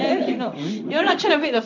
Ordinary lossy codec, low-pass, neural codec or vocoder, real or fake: none; 7.2 kHz; codec, 16 kHz in and 24 kHz out, 1 kbps, XY-Tokenizer; fake